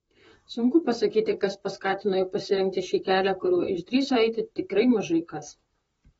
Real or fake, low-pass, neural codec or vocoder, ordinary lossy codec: fake; 19.8 kHz; vocoder, 44.1 kHz, 128 mel bands, Pupu-Vocoder; AAC, 24 kbps